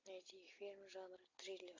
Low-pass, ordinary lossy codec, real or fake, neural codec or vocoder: 7.2 kHz; AAC, 32 kbps; real; none